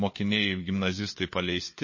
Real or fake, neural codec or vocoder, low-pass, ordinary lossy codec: fake; codec, 16 kHz, 4 kbps, FunCodec, trained on LibriTTS, 50 frames a second; 7.2 kHz; MP3, 32 kbps